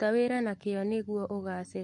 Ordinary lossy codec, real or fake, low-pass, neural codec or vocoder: MP3, 48 kbps; fake; 10.8 kHz; codec, 44.1 kHz, 7.8 kbps, Pupu-Codec